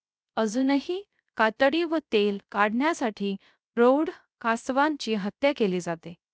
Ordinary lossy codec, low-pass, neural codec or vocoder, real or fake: none; none; codec, 16 kHz, 0.3 kbps, FocalCodec; fake